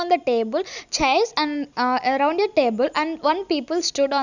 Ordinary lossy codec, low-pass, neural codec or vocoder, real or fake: none; 7.2 kHz; none; real